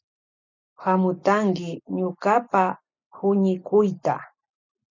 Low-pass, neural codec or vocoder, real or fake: 7.2 kHz; none; real